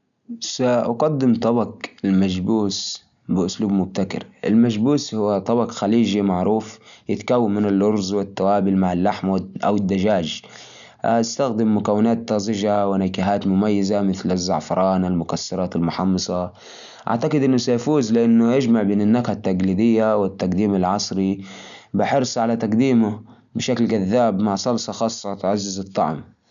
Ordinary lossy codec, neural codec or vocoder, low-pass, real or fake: none; none; 7.2 kHz; real